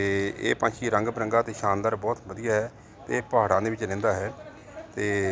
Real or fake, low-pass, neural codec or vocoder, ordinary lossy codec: real; none; none; none